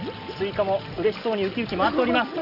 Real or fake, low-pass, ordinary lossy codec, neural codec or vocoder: real; 5.4 kHz; none; none